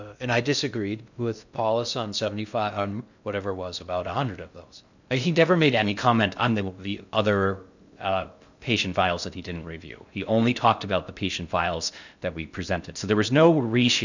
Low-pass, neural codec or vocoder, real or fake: 7.2 kHz; codec, 16 kHz in and 24 kHz out, 0.6 kbps, FocalCodec, streaming, 2048 codes; fake